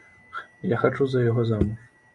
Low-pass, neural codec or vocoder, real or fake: 10.8 kHz; none; real